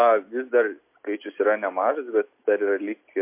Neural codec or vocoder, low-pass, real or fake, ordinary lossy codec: none; 3.6 kHz; real; MP3, 24 kbps